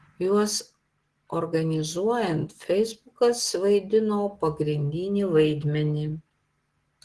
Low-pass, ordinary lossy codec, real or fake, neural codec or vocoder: 10.8 kHz; Opus, 16 kbps; real; none